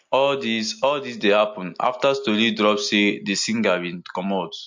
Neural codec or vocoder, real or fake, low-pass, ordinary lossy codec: none; real; 7.2 kHz; MP3, 48 kbps